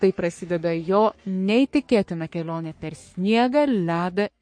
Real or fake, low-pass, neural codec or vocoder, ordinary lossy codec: fake; 9.9 kHz; codec, 44.1 kHz, 3.4 kbps, Pupu-Codec; MP3, 48 kbps